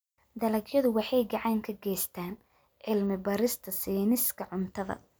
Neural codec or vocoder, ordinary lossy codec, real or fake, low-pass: none; none; real; none